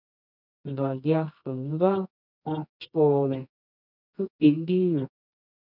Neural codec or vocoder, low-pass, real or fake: codec, 24 kHz, 0.9 kbps, WavTokenizer, medium music audio release; 5.4 kHz; fake